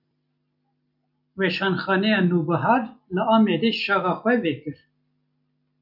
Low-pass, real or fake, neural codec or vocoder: 5.4 kHz; real; none